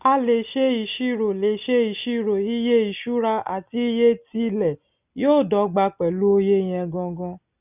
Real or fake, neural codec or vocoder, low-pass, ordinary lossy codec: real; none; 3.6 kHz; none